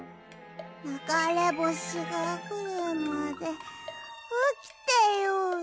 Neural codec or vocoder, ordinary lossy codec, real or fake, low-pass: none; none; real; none